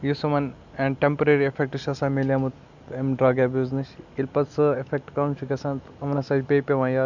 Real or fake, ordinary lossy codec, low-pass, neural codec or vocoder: real; none; 7.2 kHz; none